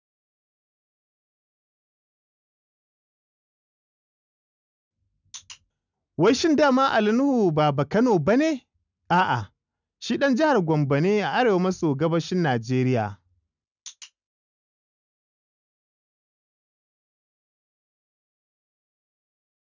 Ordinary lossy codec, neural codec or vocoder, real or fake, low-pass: none; none; real; 7.2 kHz